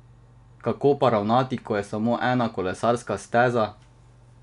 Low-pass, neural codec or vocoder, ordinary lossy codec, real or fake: 10.8 kHz; none; none; real